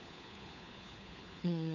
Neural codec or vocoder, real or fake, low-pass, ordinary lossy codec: codec, 16 kHz, 4 kbps, FunCodec, trained on LibriTTS, 50 frames a second; fake; 7.2 kHz; AAC, 48 kbps